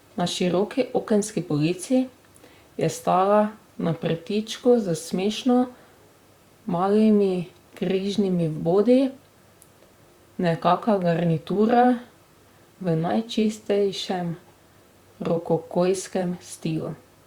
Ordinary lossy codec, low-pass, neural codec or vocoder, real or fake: Opus, 64 kbps; 19.8 kHz; vocoder, 44.1 kHz, 128 mel bands, Pupu-Vocoder; fake